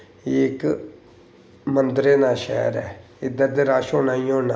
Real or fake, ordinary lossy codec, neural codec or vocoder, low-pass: real; none; none; none